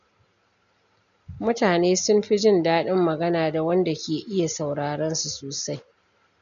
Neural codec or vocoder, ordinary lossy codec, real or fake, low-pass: none; none; real; 7.2 kHz